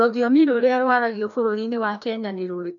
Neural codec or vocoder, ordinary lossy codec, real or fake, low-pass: codec, 16 kHz, 1 kbps, FreqCodec, larger model; none; fake; 7.2 kHz